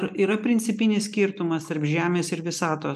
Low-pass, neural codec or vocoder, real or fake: 14.4 kHz; none; real